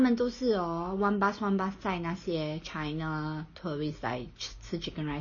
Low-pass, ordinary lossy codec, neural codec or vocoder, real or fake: 7.2 kHz; MP3, 32 kbps; none; real